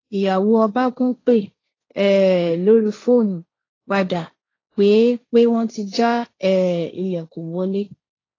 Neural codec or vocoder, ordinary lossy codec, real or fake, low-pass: codec, 16 kHz, 1.1 kbps, Voila-Tokenizer; AAC, 32 kbps; fake; 7.2 kHz